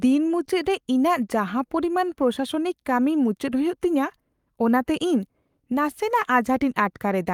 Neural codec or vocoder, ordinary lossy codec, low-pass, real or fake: codec, 44.1 kHz, 7.8 kbps, Pupu-Codec; Opus, 32 kbps; 19.8 kHz; fake